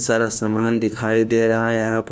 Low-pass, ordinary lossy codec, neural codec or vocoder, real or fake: none; none; codec, 16 kHz, 1 kbps, FunCodec, trained on LibriTTS, 50 frames a second; fake